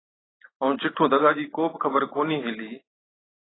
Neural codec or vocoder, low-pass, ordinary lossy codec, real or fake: none; 7.2 kHz; AAC, 16 kbps; real